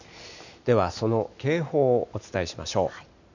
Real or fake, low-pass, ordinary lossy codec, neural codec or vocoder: real; 7.2 kHz; none; none